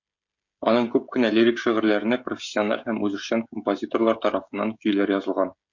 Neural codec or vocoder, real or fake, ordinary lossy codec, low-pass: codec, 16 kHz, 16 kbps, FreqCodec, smaller model; fake; MP3, 64 kbps; 7.2 kHz